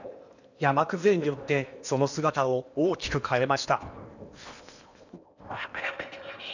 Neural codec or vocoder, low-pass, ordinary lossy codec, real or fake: codec, 16 kHz in and 24 kHz out, 0.8 kbps, FocalCodec, streaming, 65536 codes; 7.2 kHz; none; fake